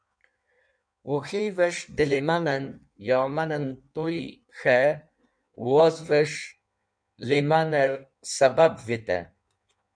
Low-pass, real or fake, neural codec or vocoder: 9.9 kHz; fake; codec, 16 kHz in and 24 kHz out, 1.1 kbps, FireRedTTS-2 codec